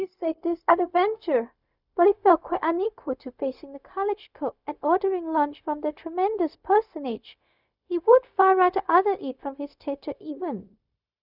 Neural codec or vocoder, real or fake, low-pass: codec, 16 kHz, 0.4 kbps, LongCat-Audio-Codec; fake; 5.4 kHz